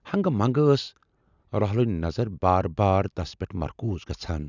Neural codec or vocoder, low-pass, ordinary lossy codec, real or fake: none; 7.2 kHz; none; real